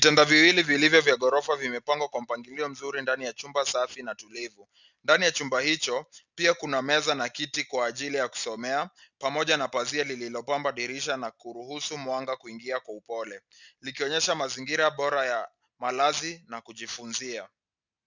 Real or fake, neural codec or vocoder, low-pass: real; none; 7.2 kHz